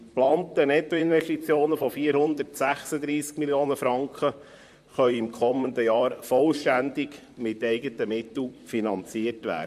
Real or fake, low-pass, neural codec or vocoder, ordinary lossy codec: fake; 14.4 kHz; vocoder, 44.1 kHz, 128 mel bands, Pupu-Vocoder; MP3, 64 kbps